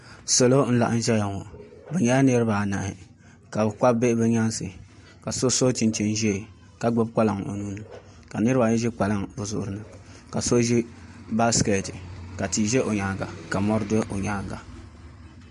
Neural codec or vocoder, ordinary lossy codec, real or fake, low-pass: none; MP3, 48 kbps; real; 14.4 kHz